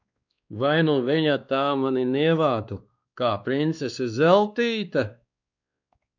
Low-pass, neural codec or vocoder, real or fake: 7.2 kHz; codec, 16 kHz, 2 kbps, X-Codec, WavLM features, trained on Multilingual LibriSpeech; fake